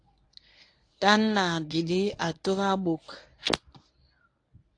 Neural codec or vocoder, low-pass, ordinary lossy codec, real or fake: codec, 24 kHz, 0.9 kbps, WavTokenizer, medium speech release version 1; 9.9 kHz; AAC, 48 kbps; fake